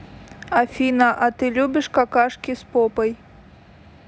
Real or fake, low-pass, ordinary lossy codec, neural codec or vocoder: real; none; none; none